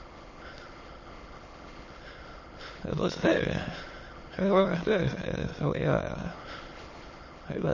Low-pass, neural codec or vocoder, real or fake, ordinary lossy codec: 7.2 kHz; autoencoder, 22.05 kHz, a latent of 192 numbers a frame, VITS, trained on many speakers; fake; MP3, 32 kbps